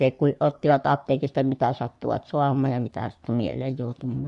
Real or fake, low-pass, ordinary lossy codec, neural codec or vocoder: fake; 10.8 kHz; none; codec, 44.1 kHz, 3.4 kbps, Pupu-Codec